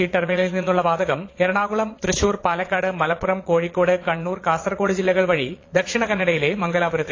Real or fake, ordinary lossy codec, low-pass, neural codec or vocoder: fake; AAC, 32 kbps; 7.2 kHz; vocoder, 22.05 kHz, 80 mel bands, Vocos